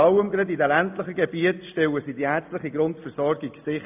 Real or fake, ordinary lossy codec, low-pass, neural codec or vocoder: real; AAC, 32 kbps; 3.6 kHz; none